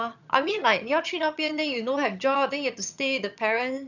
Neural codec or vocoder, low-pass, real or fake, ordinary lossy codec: vocoder, 22.05 kHz, 80 mel bands, HiFi-GAN; 7.2 kHz; fake; none